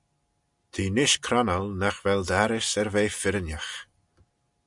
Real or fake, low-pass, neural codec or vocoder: real; 10.8 kHz; none